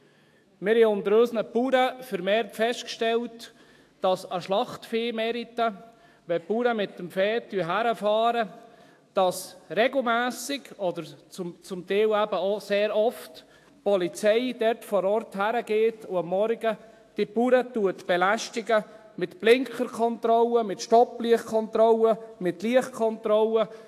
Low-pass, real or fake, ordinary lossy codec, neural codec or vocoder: 14.4 kHz; fake; AAC, 64 kbps; autoencoder, 48 kHz, 128 numbers a frame, DAC-VAE, trained on Japanese speech